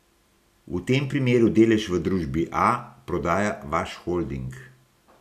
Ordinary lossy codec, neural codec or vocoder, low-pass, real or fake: none; none; 14.4 kHz; real